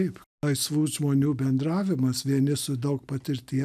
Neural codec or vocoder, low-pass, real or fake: none; 14.4 kHz; real